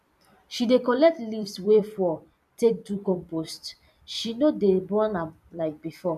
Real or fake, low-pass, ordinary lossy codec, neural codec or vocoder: fake; 14.4 kHz; none; vocoder, 44.1 kHz, 128 mel bands every 256 samples, BigVGAN v2